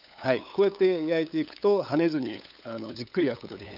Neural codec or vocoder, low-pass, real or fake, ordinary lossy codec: codec, 16 kHz, 8 kbps, FunCodec, trained on LibriTTS, 25 frames a second; 5.4 kHz; fake; none